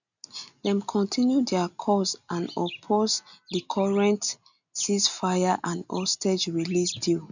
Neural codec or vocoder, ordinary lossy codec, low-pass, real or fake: none; none; 7.2 kHz; real